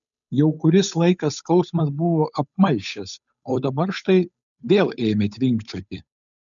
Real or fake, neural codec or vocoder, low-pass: fake; codec, 16 kHz, 8 kbps, FunCodec, trained on Chinese and English, 25 frames a second; 7.2 kHz